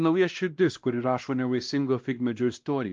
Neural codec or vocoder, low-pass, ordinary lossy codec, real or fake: codec, 16 kHz, 1 kbps, X-Codec, WavLM features, trained on Multilingual LibriSpeech; 7.2 kHz; Opus, 24 kbps; fake